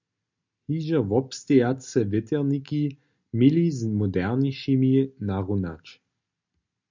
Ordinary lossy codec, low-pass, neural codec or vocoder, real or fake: MP3, 64 kbps; 7.2 kHz; none; real